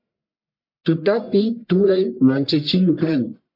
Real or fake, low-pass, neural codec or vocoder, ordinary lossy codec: fake; 5.4 kHz; codec, 44.1 kHz, 1.7 kbps, Pupu-Codec; MP3, 48 kbps